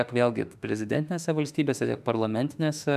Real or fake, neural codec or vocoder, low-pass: fake; autoencoder, 48 kHz, 32 numbers a frame, DAC-VAE, trained on Japanese speech; 14.4 kHz